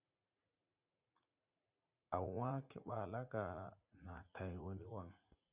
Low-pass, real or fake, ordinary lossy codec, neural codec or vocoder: 3.6 kHz; fake; Opus, 64 kbps; vocoder, 44.1 kHz, 80 mel bands, Vocos